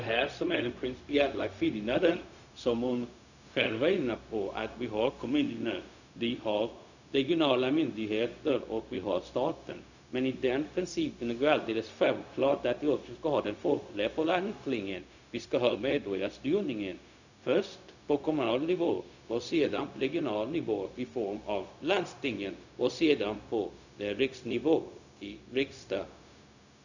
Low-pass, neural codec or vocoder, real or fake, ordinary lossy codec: 7.2 kHz; codec, 16 kHz, 0.4 kbps, LongCat-Audio-Codec; fake; none